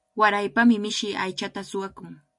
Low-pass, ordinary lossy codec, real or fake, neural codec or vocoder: 10.8 kHz; MP3, 48 kbps; real; none